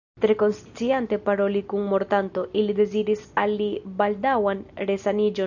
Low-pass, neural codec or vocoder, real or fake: 7.2 kHz; none; real